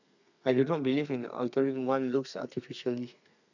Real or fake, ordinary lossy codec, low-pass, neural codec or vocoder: fake; none; 7.2 kHz; codec, 32 kHz, 1.9 kbps, SNAC